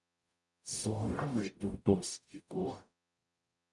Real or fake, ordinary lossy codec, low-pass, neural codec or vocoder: fake; MP3, 96 kbps; 10.8 kHz; codec, 44.1 kHz, 0.9 kbps, DAC